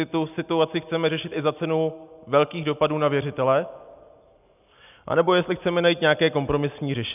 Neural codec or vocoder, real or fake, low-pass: none; real; 3.6 kHz